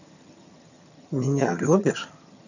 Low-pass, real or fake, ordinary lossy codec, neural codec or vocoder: 7.2 kHz; fake; none; vocoder, 22.05 kHz, 80 mel bands, HiFi-GAN